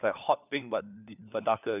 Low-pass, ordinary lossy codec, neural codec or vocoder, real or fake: 3.6 kHz; none; codec, 16 kHz, 4 kbps, FunCodec, trained on LibriTTS, 50 frames a second; fake